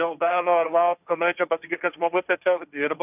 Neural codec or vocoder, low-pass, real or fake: codec, 16 kHz, 1.1 kbps, Voila-Tokenizer; 3.6 kHz; fake